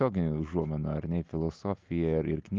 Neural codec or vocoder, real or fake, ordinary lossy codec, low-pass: none; real; Opus, 32 kbps; 7.2 kHz